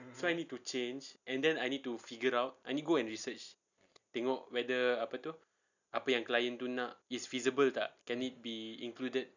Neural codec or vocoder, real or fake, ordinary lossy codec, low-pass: none; real; none; 7.2 kHz